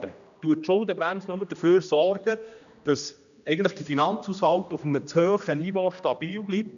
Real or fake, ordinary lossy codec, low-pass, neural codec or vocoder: fake; none; 7.2 kHz; codec, 16 kHz, 1 kbps, X-Codec, HuBERT features, trained on general audio